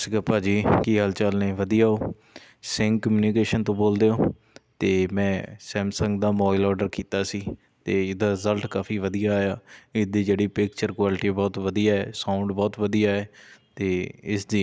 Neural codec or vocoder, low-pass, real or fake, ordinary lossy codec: none; none; real; none